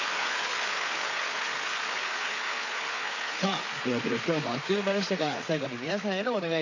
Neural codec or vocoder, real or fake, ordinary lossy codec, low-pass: codec, 16 kHz, 4 kbps, FreqCodec, larger model; fake; AAC, 48 kbps; 7.2 kHz